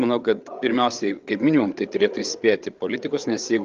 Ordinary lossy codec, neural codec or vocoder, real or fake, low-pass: Opus, 24 kbps; codec, 16 kHz, 8 kbps, FreqCodec, larger model; fake; 7.2 kHz